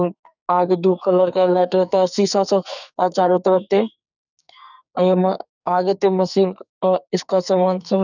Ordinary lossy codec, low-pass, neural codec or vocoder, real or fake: none; 7.2 kHz; codec, 44.1 kHz, 2.6 kbps, SNAC; fake